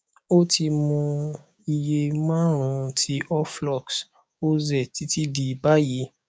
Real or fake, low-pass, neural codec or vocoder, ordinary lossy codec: fake; none; codec, 16 kHz, 6 kbps, DAC; none